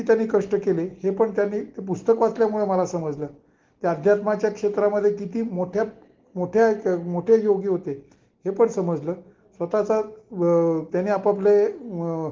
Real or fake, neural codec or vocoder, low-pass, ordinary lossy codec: real; none; 7.2 kHz; Opus, 16 kbps